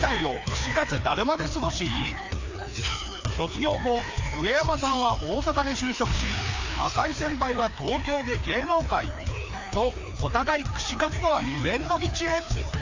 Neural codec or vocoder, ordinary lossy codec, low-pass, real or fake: codec, 16 kHz, 2 kbps, FreqCodec, larger model; AAC, 48 kbps; 7.2 kHz; fake